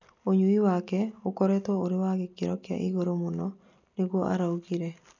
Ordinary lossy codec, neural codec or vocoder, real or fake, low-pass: none; none; real; 7.2 kHz